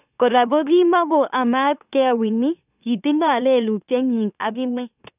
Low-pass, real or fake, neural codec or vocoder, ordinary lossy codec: 3.6 kHz; fake; autoencoder, 44.1 kHz, a latent of 192 numbers a frame, MeloTTS; none